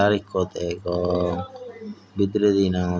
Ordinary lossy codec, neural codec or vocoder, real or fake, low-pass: none; none; real; none